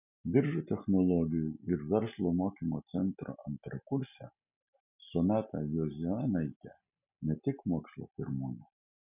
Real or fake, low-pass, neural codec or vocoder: real; 3.6 kHz; none